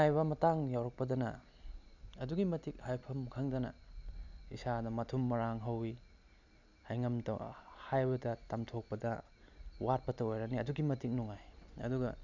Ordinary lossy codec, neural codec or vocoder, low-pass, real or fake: none; none; 7.2 kHz; real